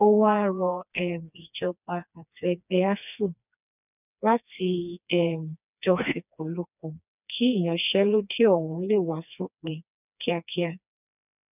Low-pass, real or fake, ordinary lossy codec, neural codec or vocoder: 3.6 kHz; fake; none; codec, 16 kHz, 2 kbps, FreqCodec, smaller model